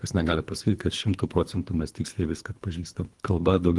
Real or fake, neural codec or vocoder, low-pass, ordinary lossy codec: fake; codec, 24 kHz, 3 kbps, HILCodec; 10.8 kHz; Opus, 24 kbps